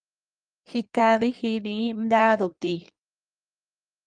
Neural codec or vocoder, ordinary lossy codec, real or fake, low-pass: codec, 16 kHz in and 24 kHz out, 1.1 kbps, FireRedTTS-2 codec; Opus, 32 kbps; fake; 9.9 kHz